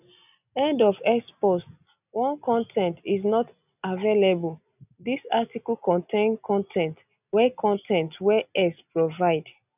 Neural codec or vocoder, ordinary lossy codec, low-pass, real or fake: none; none; 3.6 kHz; real